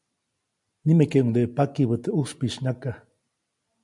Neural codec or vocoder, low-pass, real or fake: none; 10.8 kHz; real